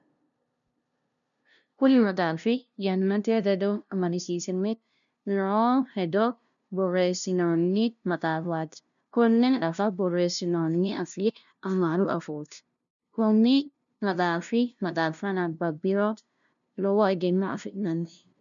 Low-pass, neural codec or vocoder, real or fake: 7.2 kHz; codec, 16 kHz, 0.5 kbps, FunCodec, trained on LibriTTS, 25 frames a second; fake